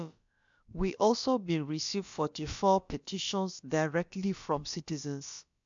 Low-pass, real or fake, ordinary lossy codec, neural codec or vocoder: 7.2 kHz; fake; MP3, 64 kbps; codec, 16 kHz, about 1 kbps, DyCAST, with the encoder's durations